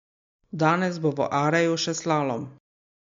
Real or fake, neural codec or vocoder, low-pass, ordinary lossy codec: real; none; 7.2 kHz; MP3, 48 kbps